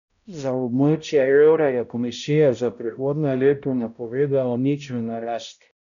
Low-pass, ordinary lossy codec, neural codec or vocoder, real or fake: 7.2 kHz; none; codec, 16 kHz, 0.5 kbps, X-Codec, HuBERT features, trained on balanced general audio; fake